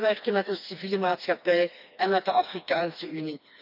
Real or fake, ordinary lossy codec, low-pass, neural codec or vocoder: fake; none; 5.4 kHz; codec, 16 kHz, 2 kbps, FreqCodec, smaller model